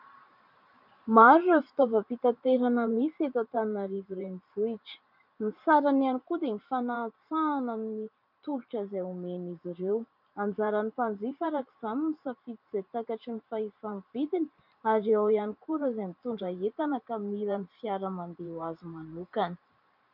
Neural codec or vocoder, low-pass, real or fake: vocoder, 44.1 kHz, 128 mel bands every 512 samples, BigVGAN v2; 5.4 kHz; fake